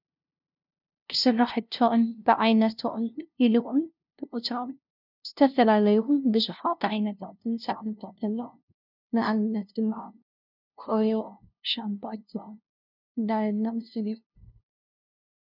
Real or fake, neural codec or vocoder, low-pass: fake; codec, 16 kHz, 0.5 kbps, FunCodec, trained on LibriTTS, 25 frames a second; 5.4 kHz